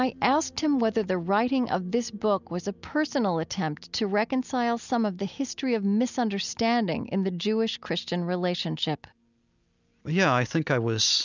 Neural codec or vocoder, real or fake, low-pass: none; real; 7.2 kHz